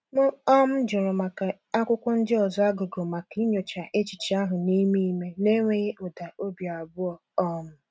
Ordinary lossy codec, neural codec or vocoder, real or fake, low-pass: none; none; real; none